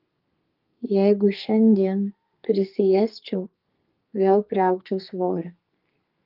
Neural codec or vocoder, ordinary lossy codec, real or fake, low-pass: codec, 32 kHz, 1.9 kbps, SNAC; Opus, 24 kbps; fake; 5.4 kHz